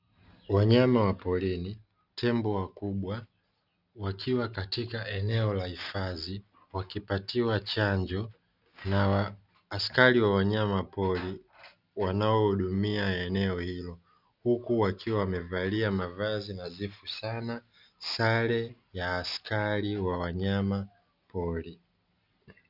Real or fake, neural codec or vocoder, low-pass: real; none; 5.4 kHz